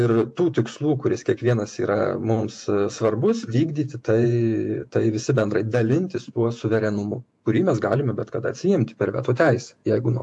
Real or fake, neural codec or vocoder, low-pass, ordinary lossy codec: fake; vocoder, 48 kHz, 128 mel bands, Vocos; 10.8 kHz; AAC, 64 kbps